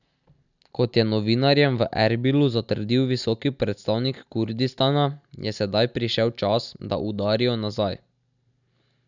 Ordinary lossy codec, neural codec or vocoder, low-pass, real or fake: none; none; 7.2 kHz; real